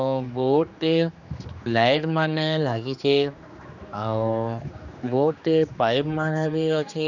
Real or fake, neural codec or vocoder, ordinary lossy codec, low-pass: fake; codec, 16 kHz, 2 kbps, X-Codec, HuBERT features, trained on general audio; none; 7.2 kHz